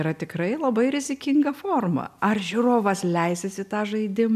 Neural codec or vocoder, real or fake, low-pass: none; real; 14.4 kHz